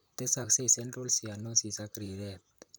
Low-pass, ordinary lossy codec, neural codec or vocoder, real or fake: none; none; vocoder, 44.1 kHz, 128 mel bands, Pupu-Vocoder; fake